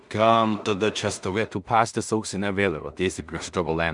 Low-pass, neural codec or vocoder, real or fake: 10.8 kHz; codec, 16 kHz in and 24 kHz out, 0.4 kbps, LongCat-Audio-Codec, two codebook decoder; fake